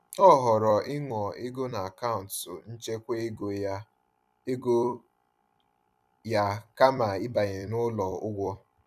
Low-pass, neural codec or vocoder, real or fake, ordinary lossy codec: 14.4 kHz; vocoder, 44.1 kHz, 128 mel bands every 256 samples, BigVGAN v2; fake; none